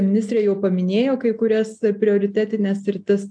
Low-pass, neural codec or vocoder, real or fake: 9.9 kHz; none; real